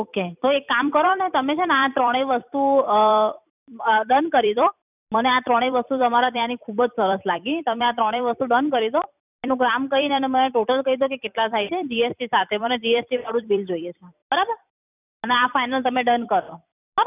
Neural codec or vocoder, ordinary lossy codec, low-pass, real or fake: vocoder, 44.1 kHz, 128 mel bands every 256 samples, BigVGAN v2; none; 3.6 kHz; fake